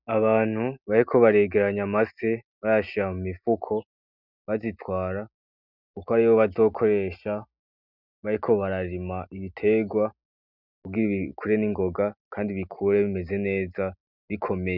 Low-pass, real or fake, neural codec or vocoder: 5.4 kHz; real; none